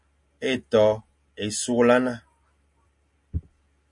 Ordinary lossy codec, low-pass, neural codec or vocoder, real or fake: MP3, 48 kbps; 10.8 kHz; none; real